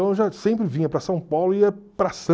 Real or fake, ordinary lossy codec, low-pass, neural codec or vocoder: real; none; none; none